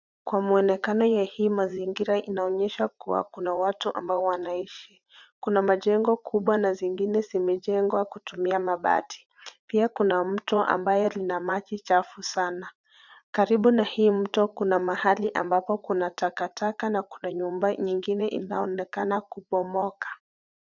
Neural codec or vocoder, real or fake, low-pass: vocoder, 44.1 kHz, 80 mel bands, Vocos; fake; 7.2 kHz